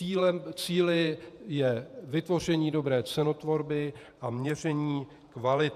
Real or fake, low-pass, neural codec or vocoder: fake; 14.4 kHz; vocoder, 48 kHz, 128 mel bands, Vocos